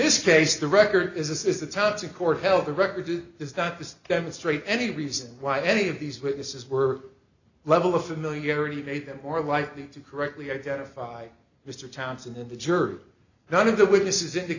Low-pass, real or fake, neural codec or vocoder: 7.2 kHz; real; none